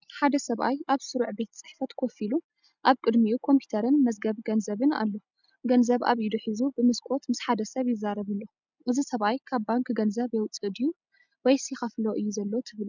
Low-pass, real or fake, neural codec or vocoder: 7.2 kHz; real; none